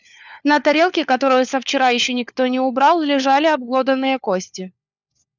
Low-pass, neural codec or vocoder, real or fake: 7.2 kHz; codec, 16 kHz, 4 kbps, FunCodec, trained on LibriTTS, 50 frames a second; fake